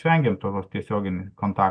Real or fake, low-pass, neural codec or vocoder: real; 9.9 kHz; none